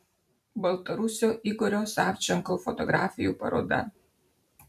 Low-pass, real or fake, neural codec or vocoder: 14.4 kHz; fake; vocoder, 44.1 kHz, 128 mel bands every 512 samples, BigVGAN v2